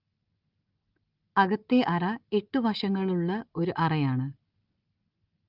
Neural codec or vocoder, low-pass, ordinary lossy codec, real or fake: none; 5.4 kHz; Opus, 32 kbps; real